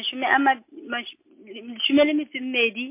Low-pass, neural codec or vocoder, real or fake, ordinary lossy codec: 3.6 kHz; none; real; MP3, 24 kbps